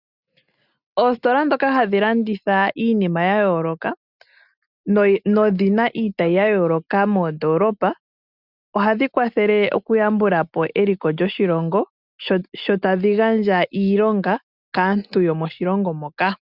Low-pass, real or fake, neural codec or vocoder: 5.4 kHz; real; none